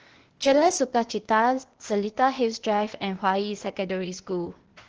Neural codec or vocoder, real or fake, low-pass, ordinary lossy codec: codec, 16 kHz, 0.8 kbps, ZipCodec; fake; 7.2 kHz; Opus, 16 kbps